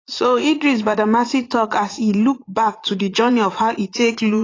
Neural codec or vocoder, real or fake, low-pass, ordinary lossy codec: vocoder, 44.1 kHz, 80 mel bands, Vocos; fake; 7.2 kHz; AAC, 32 kbps